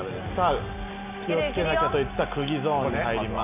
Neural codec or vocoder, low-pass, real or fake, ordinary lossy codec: none; 3.6 kHz; real; none